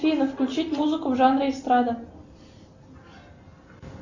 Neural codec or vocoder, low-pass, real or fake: none; 7.2 kHz; real